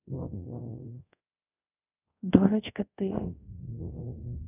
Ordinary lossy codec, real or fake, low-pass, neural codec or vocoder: none; fake; 3.6 kHz; codec, 24 kHz, 0.5 kbps, DualCodec